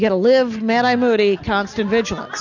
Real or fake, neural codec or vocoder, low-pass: real; none; 7.2 kHz